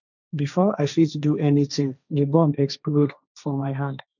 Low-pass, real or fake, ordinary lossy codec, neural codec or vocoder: 7.2 kHz; fake; none; codec, 16 kHz, 1.1 kbps, Voila-Tokenizer